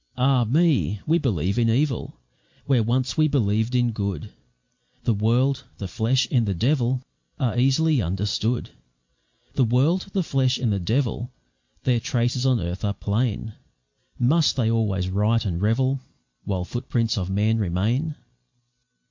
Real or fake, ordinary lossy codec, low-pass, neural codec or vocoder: real; MP3, 48 kbps; 7.2 kHz; none